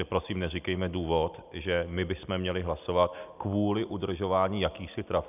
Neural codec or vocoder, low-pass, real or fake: none; 3.6 kHz; real